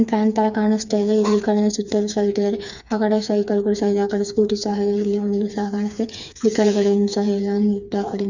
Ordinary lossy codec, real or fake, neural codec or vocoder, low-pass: none; fake; codec, 16 kHz, 4 kbps, FreqCodec, smaller model; 7.2 kHz